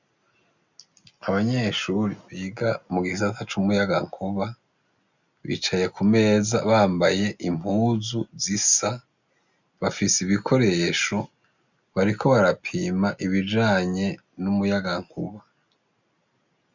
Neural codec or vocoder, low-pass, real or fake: none; 7.2 kHz; real